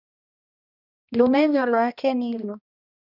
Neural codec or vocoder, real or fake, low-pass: codec, 16 kHz, 1 kbps, X-Codec, HuBERT features, trained on balanced general audio; fake; 5.4 kHz